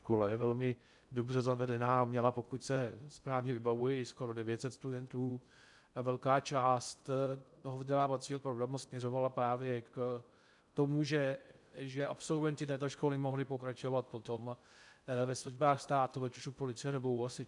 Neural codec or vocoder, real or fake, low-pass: codec, 16 kHz in and 24 kHz out, 0.6 kbps, FocalCodec, streaming, 2048 codes; fake; 10.8 kHz